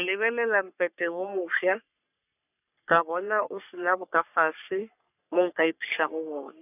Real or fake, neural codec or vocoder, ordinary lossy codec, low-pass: fake; codec, 44.1 kHz, 3.4 kbps, Pupu-Codec; none; 3.6 kHz